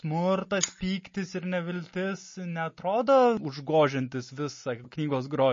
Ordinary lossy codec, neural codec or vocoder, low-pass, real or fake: MP3, 32 kbps; none; 7.2 kHz; real